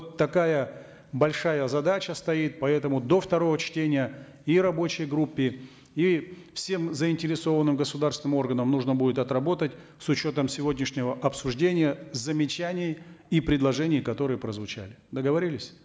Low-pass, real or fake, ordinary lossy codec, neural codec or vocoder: none; real; none; none